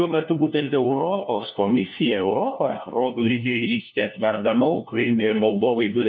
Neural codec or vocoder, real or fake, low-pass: codec, 16 kHz, 1 kbps, FunCodec, trained on LibriTTS, 50 frames a second; fake; 7.2 kHz